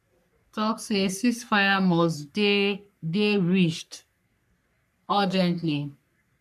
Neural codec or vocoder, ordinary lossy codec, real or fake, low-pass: codec, 44.1 kHz, 3.4 kbps, Pupu-Codec; MP3, 96 kbps; fake; 14.4 kHz